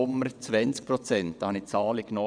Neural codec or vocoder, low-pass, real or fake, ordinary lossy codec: vocoder, 22.05 kHz, 80 mel bands, WaveNeXt; 9.9 kHz; fake; none